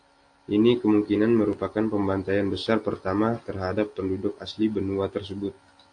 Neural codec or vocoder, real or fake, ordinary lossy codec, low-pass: none; real; AAC, 48 kbps; 9.9 kHz